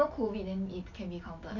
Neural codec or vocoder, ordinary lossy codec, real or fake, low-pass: none; none; real; 7.2 kHz